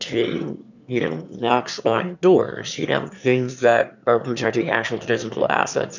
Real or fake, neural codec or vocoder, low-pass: fake; autoencoder, 22.05 kHz, a latent of 192 numbers a frame, VITS, trained on one speaker; 7.2 kHz